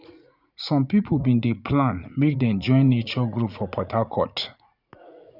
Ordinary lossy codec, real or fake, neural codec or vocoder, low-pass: none; fake; vocoder, 44.1 kHz, 80 mel bands, Vocos; 5.4 kHz